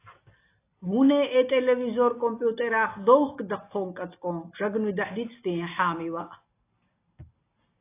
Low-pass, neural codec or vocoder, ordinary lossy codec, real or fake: 3.6 kHz; none; AAC, 24 kbps; real